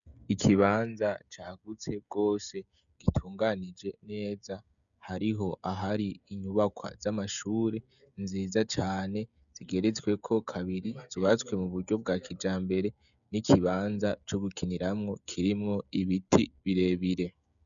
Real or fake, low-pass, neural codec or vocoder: fake; 7.2 kHz; codec, 16 kHz, 16 kbps, FreqCodec, smaller model